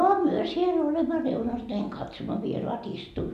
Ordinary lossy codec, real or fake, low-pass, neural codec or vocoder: none; real; 14.4 kHz; none